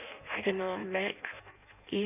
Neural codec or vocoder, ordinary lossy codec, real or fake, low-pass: codec, 16 kHz in and 24 kHz out, 0.6 kbps, FireRedTTS-2 codec; none; fake; 3.6 kHz